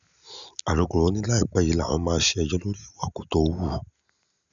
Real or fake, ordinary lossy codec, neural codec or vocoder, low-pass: real; none; none; 7.2 kHz